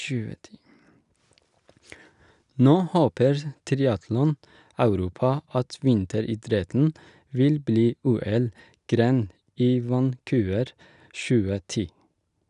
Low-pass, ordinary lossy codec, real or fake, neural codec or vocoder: 10.8 kHz; none; real; none